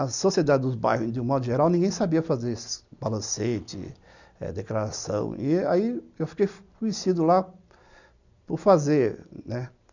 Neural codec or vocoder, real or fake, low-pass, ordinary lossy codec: none; real; 7.2 kHz; none